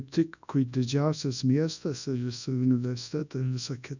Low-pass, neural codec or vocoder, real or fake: 7.2 kHz; codec, 24 kHz, 0.9 kbps, WavTokenizer, large speech release; fake